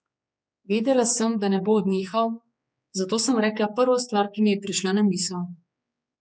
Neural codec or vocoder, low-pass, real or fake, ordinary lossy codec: codec, 16 kHz, 4 kbps, X-Codec, HuBERT features, trained on general audio; none; fake; none